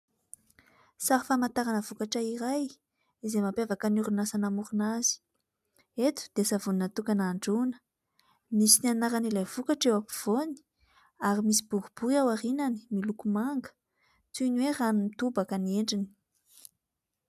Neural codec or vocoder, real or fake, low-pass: none; real; 14.4 kHz